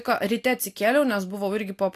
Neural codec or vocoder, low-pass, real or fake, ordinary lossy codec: none; 14.4 kHz; real; AAC, 64 kbps